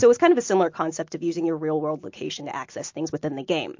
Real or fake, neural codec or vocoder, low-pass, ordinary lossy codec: real; none; 7.2 kHz; MP3, 48 kbps